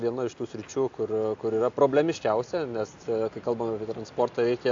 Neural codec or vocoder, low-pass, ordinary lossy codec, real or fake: none; 7.2 kHz; AAC, 48 kbps; real